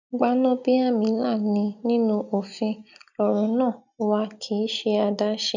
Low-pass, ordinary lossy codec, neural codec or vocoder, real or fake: 7.2 kHz; none; none; real